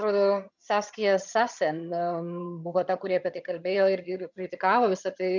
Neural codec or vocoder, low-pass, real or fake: vocoder, 22.05 kHz, 80 mel bands, HiFi-GAN; 7.2 kHz; fake